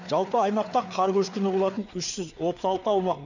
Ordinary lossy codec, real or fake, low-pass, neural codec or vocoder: none; fake; 7.2 kHz; codec, 16 kHz, 4 kbps, FreqCodec, larger model